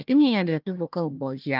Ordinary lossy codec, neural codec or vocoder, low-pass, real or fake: Opus, 24 kbps; codec, 16 kHz, 1 kbps, FunCodec, trained on Chinese and English, 50 frames a second; 5.4 kHz; fake